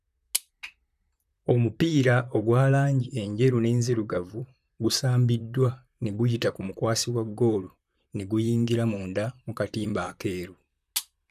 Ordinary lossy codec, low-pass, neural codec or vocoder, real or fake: none; 14.4 kHz; vocoder, 44.1 kHz, 128 mel bands, Pupu-Vocoder; fake